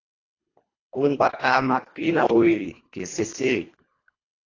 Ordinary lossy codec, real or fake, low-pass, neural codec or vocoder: AAC, 32 kbps; fake; 7.2 kHz; codec, 24 kHz, 1.5 kbps, HILCodec